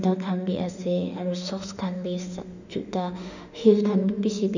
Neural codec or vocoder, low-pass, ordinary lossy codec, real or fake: autoencoder, 48 kHz, 32 numbers a frame, DAC-VAE, trained on Japanese speech; 7.2 kHz; none; fake